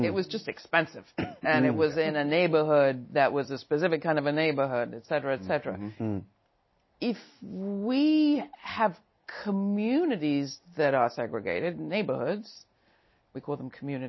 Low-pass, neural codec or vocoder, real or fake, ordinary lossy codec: 7.2 kHz; none; real; MP3, 24 kbps